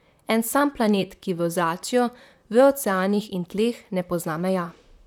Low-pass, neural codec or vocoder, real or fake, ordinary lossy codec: 19.8 kHz; vocoder, 44.1 kHz, 128 mel bands, Pupu-Vocoder; fake; none